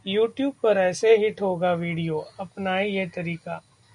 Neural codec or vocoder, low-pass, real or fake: none; 10.8 kHz; real